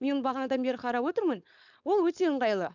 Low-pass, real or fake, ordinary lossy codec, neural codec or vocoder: 7.2 kHz; fake; none; codec, 16 kHz, 4.8 kbps, FACodec